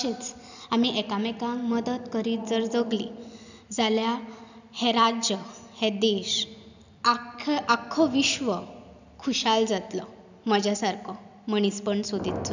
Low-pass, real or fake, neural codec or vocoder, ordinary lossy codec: 7.2 kHz; real; none; none